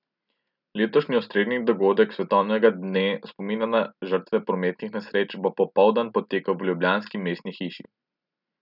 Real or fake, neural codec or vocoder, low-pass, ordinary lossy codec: real; none; 5.4 kHz; none